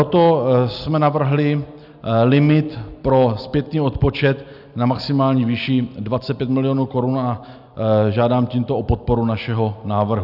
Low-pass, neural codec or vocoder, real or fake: 5.4 kHz; none; real